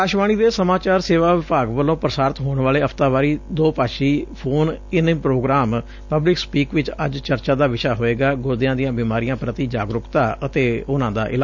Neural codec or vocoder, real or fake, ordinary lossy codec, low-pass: none; real; none; 7.2 kHz